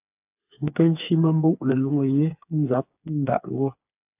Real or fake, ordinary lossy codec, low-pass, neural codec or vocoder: fake; AAC, 32 kbps; 3.6 kHz; codec, 16 kHz, 4 kbps, FreqCodec, smaller model